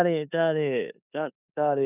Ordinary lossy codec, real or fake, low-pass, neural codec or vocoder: none; fake; 3.6 kHz; codec, 16 kHz in and 24 kHz out, 0.9 kbps, LongCat-Audio-Codec, four codebook decoder